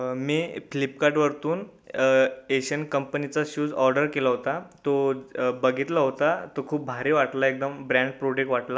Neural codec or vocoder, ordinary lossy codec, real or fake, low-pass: none; none; real; none